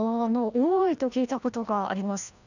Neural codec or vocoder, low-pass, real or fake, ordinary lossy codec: codec, 16 kHz, 1 kbps, FreqCodec, larger model; 7.2 kHz; fake; none